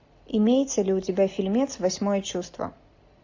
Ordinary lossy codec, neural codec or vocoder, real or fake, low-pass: AAC, 48 kbps; none; real; 7.2 kHz